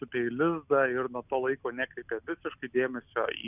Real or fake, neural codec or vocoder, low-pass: real; none; 3.6 kHz